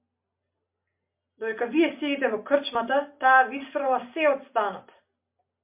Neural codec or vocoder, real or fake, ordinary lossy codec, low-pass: none; real; MP3, 32 kbps; 3.6 kHz